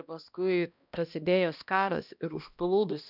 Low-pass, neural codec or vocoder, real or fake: 5.4 kHz; codec, 16 kHz, 1 kbps, X-Codec, HuBERT features, trained on balanced general audio; fake